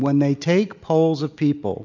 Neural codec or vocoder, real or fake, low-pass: none; real; 7.2 kHz